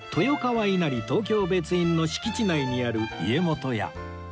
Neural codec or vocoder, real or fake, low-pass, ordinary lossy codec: none; real; none; none